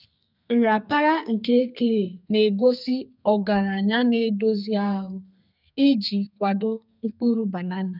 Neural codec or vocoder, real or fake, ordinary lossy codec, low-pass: codec, 32 kHz, 1.9 kbps, SNAC; fake; none; 5.4 kHz